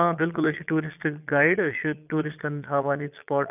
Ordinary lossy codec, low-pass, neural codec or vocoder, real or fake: none; 3.6 kHz; vocoder, 22.05 kHz, 80 mel bands, Vocos; fake